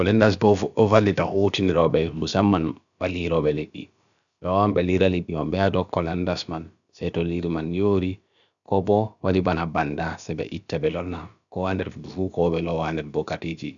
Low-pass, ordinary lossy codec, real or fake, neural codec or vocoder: 7.2 kHz; none; fake; codec, 16 kHz, about 1 kbps, DyCAST, with the encoder's durations